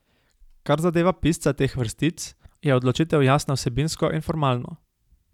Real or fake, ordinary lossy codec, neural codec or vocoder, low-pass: real; none; none; 19.8 kHz